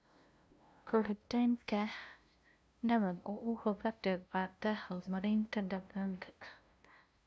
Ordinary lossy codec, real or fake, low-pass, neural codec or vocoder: none; fake; none; codec, 16 kHz, 0.5 kbps, FunCodec, trained on LibriTTS, 25 frames a second